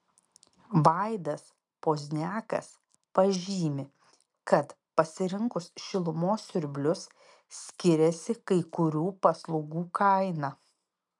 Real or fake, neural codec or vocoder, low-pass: real; none; 10.8 kHz